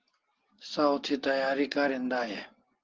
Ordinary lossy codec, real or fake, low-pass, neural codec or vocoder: Opus, 16 kbps; real; 7.2 kHz; none